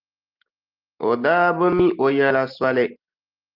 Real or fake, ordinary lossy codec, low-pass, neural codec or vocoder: real; Opus, 24 kbps; 5.4 kHz; none